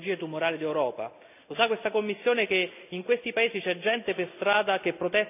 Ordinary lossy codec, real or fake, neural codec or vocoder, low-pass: none; real; none; 3.6 kHz